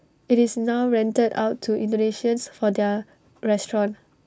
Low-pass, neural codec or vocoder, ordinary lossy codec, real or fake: none; none; none; real